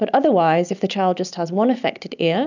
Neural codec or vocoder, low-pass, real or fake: none; 7.2 kHz; real